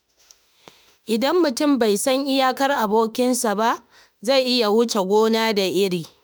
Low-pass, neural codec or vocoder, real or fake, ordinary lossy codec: none; autoencoder, 48 kHz, 32 numbers a frame, DAC-VAE, trained on Japanese speech; fake; none